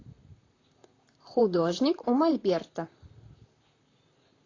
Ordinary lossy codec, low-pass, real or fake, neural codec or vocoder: AAC, 32 kbps; 7.2 kHz; fake; vocoder, 44.1 kHz, 128 mel bands, Pupu-Vocoder